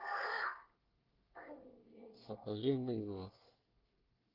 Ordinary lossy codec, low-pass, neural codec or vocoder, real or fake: Opus, 32 kbps; 5.4 kHz; codec, 24 kHz, 1 kbps, SNAC; fake